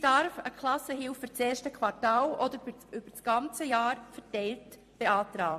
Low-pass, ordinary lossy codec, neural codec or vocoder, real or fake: 14.4 kHz; MP3, 64 kbps; vocoder, 44.1 kHz, 128 mel bands every 256 samples, BigVGAN v2; fake